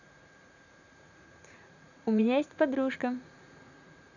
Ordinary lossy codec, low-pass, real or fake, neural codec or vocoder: none; 7.2 kHz; fake; vocoder, 44.1 kHz, 80 mel bands, Vocos